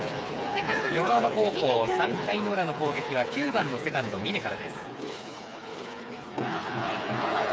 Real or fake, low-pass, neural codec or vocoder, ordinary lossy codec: fake; none; codec, 16 kHz, 4 kbps, FreqCodec, smaller model; none